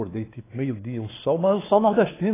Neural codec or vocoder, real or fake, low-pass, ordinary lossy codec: codec, 16 kHz, 2 kbps, X-Codec, WavLM features, trained on Multilingual LibriSpeech; fake; 3.6 kHz; AAC, 16 kbps